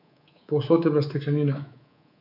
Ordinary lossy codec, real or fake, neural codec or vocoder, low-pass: none; fake; codec, 24 kHz, 3.1 kbps, DualCodec; 5.4 kHz